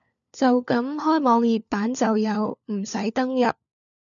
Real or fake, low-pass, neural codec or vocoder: fake; 7.2 kHz; codec, 16 kHz, 4 kbps, FunCodec, trained on LibriTTS, 50 frames a second